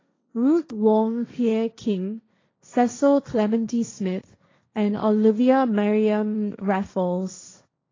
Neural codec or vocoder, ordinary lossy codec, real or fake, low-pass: codec, 16 kHz, 1.1 kbps, Voila-Tokenizer; AAC, 32 kbps; fake; 7.2 kHz